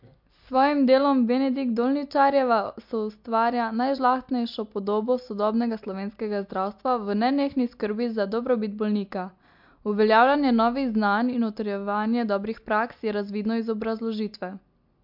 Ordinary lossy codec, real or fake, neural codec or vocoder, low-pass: MP3, 48 kbps; real; none; 5.4 kHz